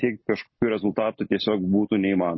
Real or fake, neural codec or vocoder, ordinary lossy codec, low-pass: real; none; MP3, 24 kbps; 7.2 kHz